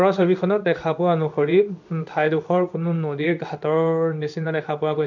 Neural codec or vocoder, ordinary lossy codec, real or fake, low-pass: codec, 16 kHz in and 24 kHz out, 1 kbps, XY-Tokenizer; none; fake; 7.2 kHz